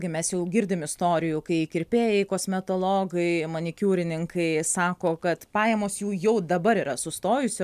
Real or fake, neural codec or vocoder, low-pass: real; none; 14.4 kHz